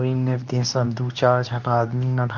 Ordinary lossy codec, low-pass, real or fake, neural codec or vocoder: none; 7.2 kHz; fake; codec, 24 kHz, 0.9 kbps, WavTokenizer, medium speech release version 2